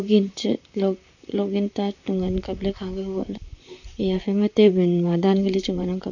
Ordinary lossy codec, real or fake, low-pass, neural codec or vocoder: none; fake; 7.2 kHz; vocoder, 44.1 kHz, 128 mel bands, Pupu-Vocoder